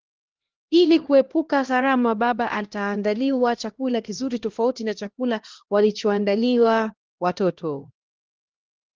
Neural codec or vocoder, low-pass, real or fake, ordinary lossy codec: codec, 16 kHz, 1 kbps, X-Codec, HuBERT features, trained on LibriSpeech; 7.2 kHz; fake; Opus, 16 kbps